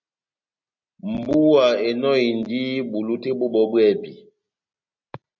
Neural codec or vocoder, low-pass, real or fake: none; 7.2 kHz; real